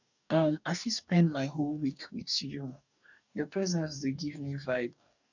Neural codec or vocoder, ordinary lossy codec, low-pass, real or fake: codec, 44.1 kHz, 2.6 kbps, DAC; none; 7.2 kHz; fake